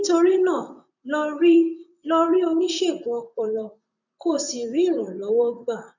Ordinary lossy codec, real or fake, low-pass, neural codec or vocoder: none; fake; 7.2 kHz; vocoder, 44.1 kHz, 128 mel bands, Pupu-Vocoder